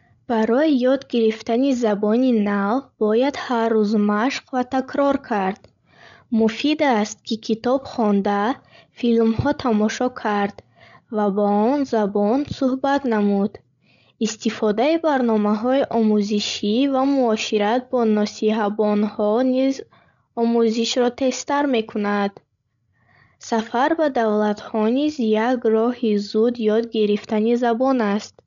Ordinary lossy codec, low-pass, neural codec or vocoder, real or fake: none; 7.2 kHz; codec, 16 kHz, 16 kbps, FreqCodec, larger model; fake